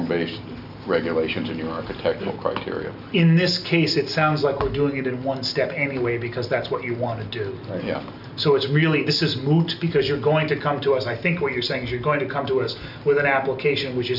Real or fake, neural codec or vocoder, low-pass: real; none; 5.4 kHz